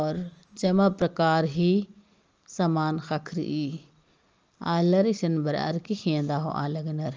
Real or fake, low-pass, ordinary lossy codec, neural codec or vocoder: real; 7.2 kHz; Opus, 24 kbps; none